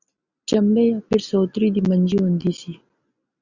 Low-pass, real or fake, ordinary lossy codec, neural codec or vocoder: 7.2 kHz; real; Opus, 64 kbps; none